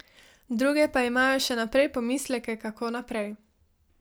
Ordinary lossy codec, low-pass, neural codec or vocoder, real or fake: none; none; none; real